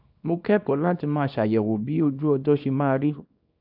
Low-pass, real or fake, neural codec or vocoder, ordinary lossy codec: 5.4 kHz; fake; codec, 24 kHz, 0.9 kbps, WavTokenizer, small release; AAC, 48 kbps